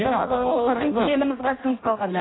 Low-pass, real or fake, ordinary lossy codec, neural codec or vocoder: 7.2 kHz; fake; AAC, 16 kbps; codec, 16 kHz in and 24 kHz out, 0.6 kbps, FireRedTTS-2 codec